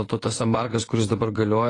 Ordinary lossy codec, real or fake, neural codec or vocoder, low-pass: AAC, 32 kbps; fake; vocoder, 24 kHz, 100 mel bands, Vocos; 10.8 kHz